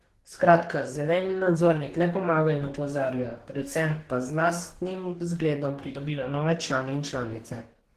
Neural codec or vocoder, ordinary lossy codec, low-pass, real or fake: codec, 44.1 kHz, 2.6 kbps, DAC; Opus, 16 kbps; 14.4 kHz; fake